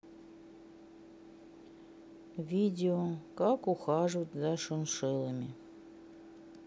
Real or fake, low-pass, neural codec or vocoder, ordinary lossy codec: real; none; none; none